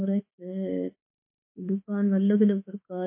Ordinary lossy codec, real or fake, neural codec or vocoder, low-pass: MP3, 24 kbps; fake; autoencoder, 48 kHz, 32 numbers a frame, DAC-VAE, trained on Japanese speech; 3.6 kHz